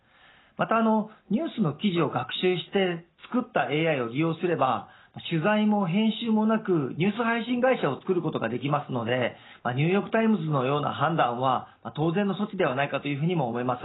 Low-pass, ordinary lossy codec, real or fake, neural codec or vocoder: 7.2 kHz; AAC, 16 kbps; real; none